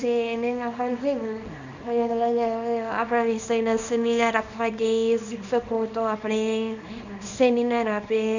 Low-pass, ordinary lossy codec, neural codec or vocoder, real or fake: 7.2 kHz; none; codec, 24 kHz, 0.9 kbps, WavTokenizer, small release; fake